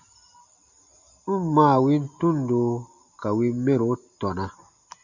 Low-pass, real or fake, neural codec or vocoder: 7.2 kHz; real; none